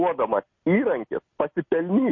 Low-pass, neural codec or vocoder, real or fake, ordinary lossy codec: 7.2 kHz; none; real; MP3, 32 kbps